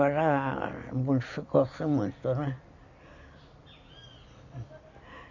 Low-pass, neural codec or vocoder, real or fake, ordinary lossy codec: 7.2 kHz; none; real; none